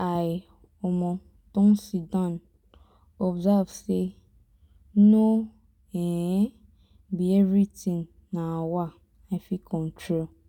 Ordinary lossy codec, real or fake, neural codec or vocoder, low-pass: none; real; none; 19.8 kHz